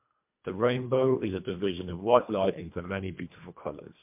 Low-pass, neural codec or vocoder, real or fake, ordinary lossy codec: 3.6 kHz; codec, 24 kHz, 1.5 kbps, HILCodec; fake; MP3, 32 kbps